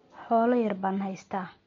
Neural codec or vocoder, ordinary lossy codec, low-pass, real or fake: none; MP3, 48 kbps; 7.2 kHz; real